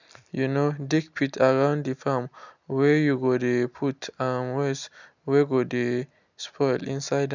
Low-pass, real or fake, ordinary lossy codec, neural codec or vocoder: 7.2 kHz; real; none; none